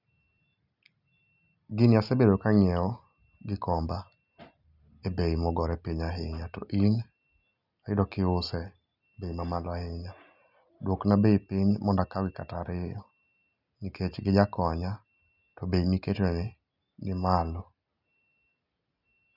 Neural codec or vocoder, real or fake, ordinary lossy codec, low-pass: none; real; none; 5.4 kHz